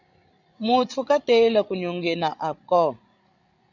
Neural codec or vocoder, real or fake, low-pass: codec, 16 kHz, 16 kbps, FreqCodec, larger model; fake; 7.2 kHz